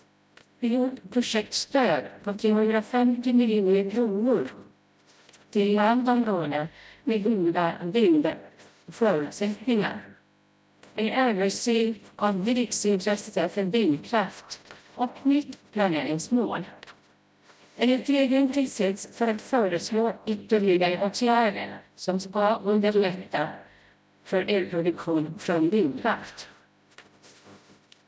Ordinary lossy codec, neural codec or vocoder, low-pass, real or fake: none; codec, 16 kHz, 0.5 kbps, FreqCodec, smaller model; none; fake